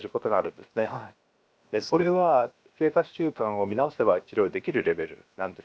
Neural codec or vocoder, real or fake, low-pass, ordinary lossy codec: codec, 16 kHz, 0.7 kbps, FocalCodec; fake; none; none